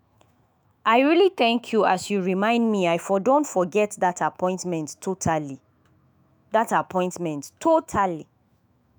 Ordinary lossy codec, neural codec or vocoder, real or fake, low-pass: none; autoencoder, 48 kHz, 128 numbers a frame, DAC-VAE, trained on Japanese speech; fake; none